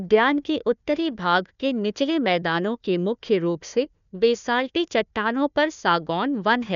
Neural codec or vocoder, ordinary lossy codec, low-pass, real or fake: codec, 16 kHz, 1 kbps, FunCodec, trained on Chinese and English, 50 frames a second; none; 7.2 kHz; fake